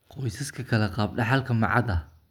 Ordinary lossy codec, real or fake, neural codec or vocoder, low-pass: none; real; none; 19.8 kHz